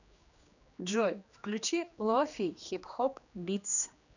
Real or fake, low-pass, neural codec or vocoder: fake; 7.2 kHz; codec, 16 kHz, 2 kbps, X-Codec, HuBERT features, trained on general audio